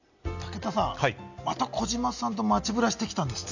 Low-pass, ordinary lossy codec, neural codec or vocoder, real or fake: 7.2 kHz; none; none; real